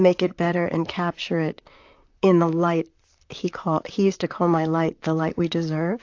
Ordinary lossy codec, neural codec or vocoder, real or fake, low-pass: AAC, 48 kbps; vocoder, 44.1 kHz, 128 mel bands, Pupu-Vocoder; fake; 7.2 kHz